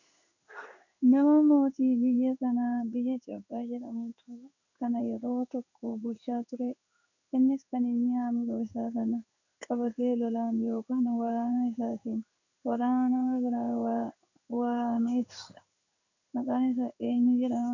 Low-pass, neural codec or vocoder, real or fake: 7.2 kHz; codec, 16 kHz in and 24 kHz out, 1 kbps, XY-Tokenizer; fake